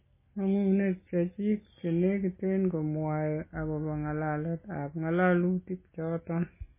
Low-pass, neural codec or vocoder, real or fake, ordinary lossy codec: 3.6 kHz; none; real; MP3, 16 kbps